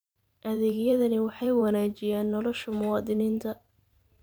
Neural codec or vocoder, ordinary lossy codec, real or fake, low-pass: vocoder, 44.1 kHz, 128 mel bands every 512 samples, BigVGAN v2; none; fake; none